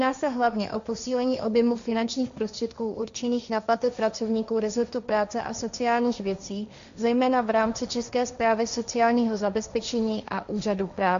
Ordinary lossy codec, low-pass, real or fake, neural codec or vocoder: MP3, 64 kbps; 7.2 kHz; fake; codec, 16 kHz, 1.1 kbps, Voila-Tokenizer